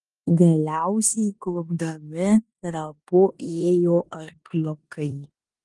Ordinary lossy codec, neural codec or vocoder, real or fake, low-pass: Opus, 24 kbps; codec, 16 kHz in and 24 kHz out, 0.9 kbps, LongCat-Audio-Codec, four codebook decoder; fake; 10.8 kHz